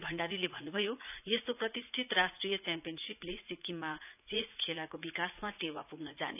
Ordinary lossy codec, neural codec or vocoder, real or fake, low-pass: none; vocoder, 44.1 kHz, 80 mel bands, Vocos; fake; 3.6 kHz